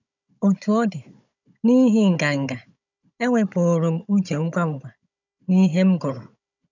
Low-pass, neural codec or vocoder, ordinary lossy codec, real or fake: 7.2 kHz; codec, 16 kHz, 16 kbps, FunCodec, trained on Chinese and English, 50 frames a second; none; fake